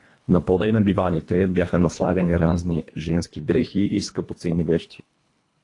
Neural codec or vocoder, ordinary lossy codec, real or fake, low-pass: codec, 24 kHz, 1.5 kbps, HILCodec; AAC, 48 kbps; fake; 10.8 kHz